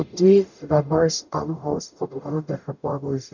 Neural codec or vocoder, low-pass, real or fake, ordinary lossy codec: codec, 44.1 kHz, 0.9 kbps, DAC; 7.2 kHz; fake; none